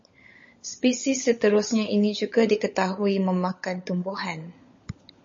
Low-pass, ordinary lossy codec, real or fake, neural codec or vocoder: 7.2 kHz; MP3, 32 kbps; fake; codec, 16 kHz, 16 kbps, FunCodec, trained on LibriTTS, 50 frames a second